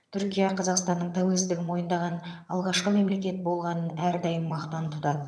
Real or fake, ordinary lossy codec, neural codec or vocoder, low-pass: fake; none; vocoder, 22.05 kHz, 80 mel bands, HiFi-GAN; none